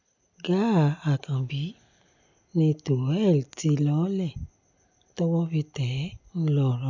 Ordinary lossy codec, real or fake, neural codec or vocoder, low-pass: none; real; none; 7.2 kHz